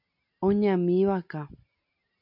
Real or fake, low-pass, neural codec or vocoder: real; 5.4 kHz; none